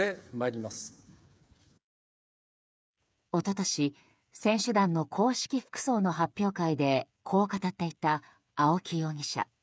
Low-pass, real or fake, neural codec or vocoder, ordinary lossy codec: none; fake; codec, 16 kHz, 8 kbps, FreqCodec, smaller model; none